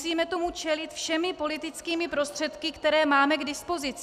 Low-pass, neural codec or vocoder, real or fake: 14.4 kHz; none; real